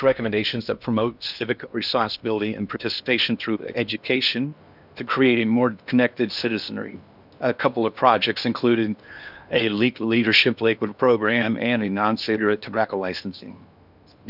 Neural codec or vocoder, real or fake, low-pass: codec, 16 kHz in and 24 kHz out, 0.8 kbps, FocalCodec, streaming, 65536 codes; fake; 5.4 kHz